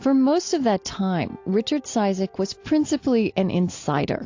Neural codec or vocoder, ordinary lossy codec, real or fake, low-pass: none; AAC, 48 kbps; real; 7.2 kHz